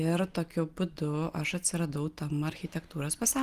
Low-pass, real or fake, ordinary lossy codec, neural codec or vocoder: 14.4 kHz; real; Opus, 24 kbps; none